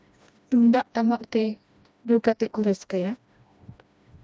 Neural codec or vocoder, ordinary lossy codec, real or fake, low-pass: codec, 16 kHz, 1 kbps, FreqCodec, smaller model; none; fake; none